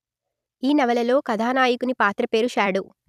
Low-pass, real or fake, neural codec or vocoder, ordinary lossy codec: 14.4 kHz; fake; vocoder, 44.1 kHz, 128 mel bands every 256 samples, BigVGAN v2; none